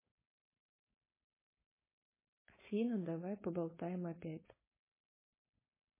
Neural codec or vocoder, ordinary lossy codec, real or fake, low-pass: codec, 16 kHz, 4.8 kbps, FACodec; MP3, 16 kbps; fake; 3.6 kHz